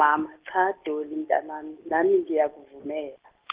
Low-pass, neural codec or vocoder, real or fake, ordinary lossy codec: 3.6 kHz; none; real; Opus, 32 kbps